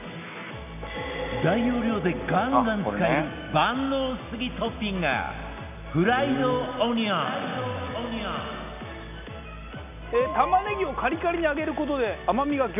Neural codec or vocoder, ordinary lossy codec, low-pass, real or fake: none; none; 3.6 kHz; real